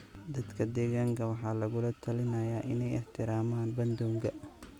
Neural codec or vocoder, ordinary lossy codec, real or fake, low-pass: none; Opus, 64 kbps; real; 19.8 kHz